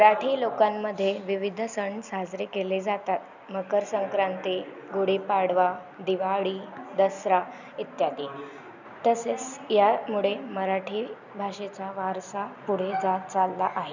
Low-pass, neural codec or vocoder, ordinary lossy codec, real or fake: 7.2 kHz; none; none; real